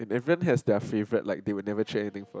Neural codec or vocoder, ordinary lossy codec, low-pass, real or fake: none; none; none; real